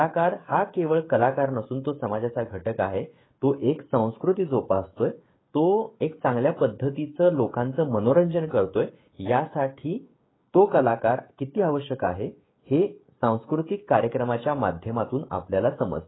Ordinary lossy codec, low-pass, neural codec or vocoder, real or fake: AAC, 16 kbps; 7.2 kHz; codec, 16 kHz, 16 kbps, FreqCodec, smaller model; fake